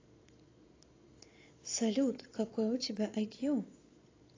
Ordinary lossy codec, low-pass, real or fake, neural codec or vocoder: AAC, 32 kbps; 7.2 kHz; fake; vocoder, 22.05 kHz, 80 mel bands, Vocos